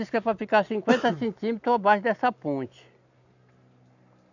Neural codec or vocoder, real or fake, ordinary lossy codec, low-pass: none; real; none; 7.2 kHz